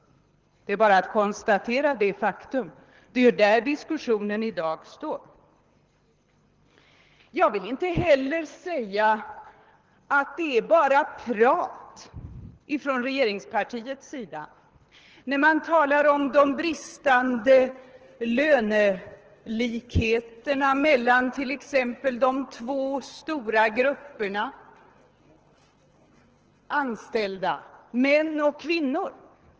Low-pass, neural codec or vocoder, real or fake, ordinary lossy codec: 7.2 kHz; codec, 24 kHz, 6 kbps, HILCodec; fake; Opus, 16 kbps